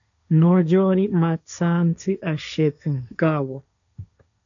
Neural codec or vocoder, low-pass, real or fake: codec, 16 kHz, 1.1 kbps, Voila-Tokenizer; 7.2 kHz; fake